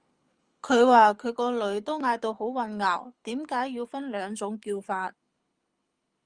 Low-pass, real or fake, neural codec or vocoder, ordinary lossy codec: 9.9 kHz; fake; codec, 24 kHz, 6 kbps, HILCodec; Opus, 32 kbps